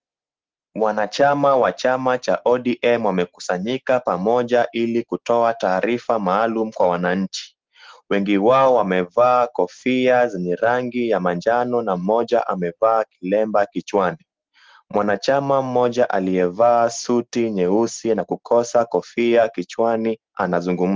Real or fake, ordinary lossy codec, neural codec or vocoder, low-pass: real; Opus, 16 kbps; none; 7.2 kHz